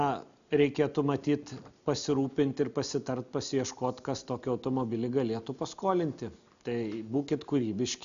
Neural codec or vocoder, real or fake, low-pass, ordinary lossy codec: none; real; 7.2 kHz; MP3, 96 kbps